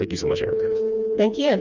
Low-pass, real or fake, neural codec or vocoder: 7.2 kHz; fake; codec, 16 kHz, 2 kbps, FreqCodec, smaller model